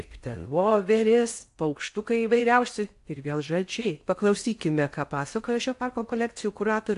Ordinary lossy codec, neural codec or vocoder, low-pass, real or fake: AAC, 64 kbps; codec, 16 kHz in and 24 kHz out, 0.6 kbps, FocalCodec, streaming, 4096 codes; 10.8 kHz; fake